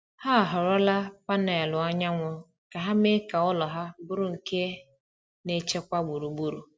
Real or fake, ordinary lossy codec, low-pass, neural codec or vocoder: real; none; none; none